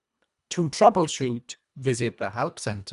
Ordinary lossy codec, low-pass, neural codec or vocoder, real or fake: none; 10.8 kHz; codec, 24 kHz, 1.5 kbps, HILCodec; fake